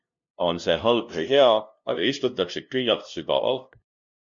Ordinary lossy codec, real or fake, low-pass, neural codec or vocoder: MP3, 48 kbps; fake; 7.2 kHz; codec, 16 kHz, 0.5 kbps, FunCodec, trained on LibriTTS, 25 frames a second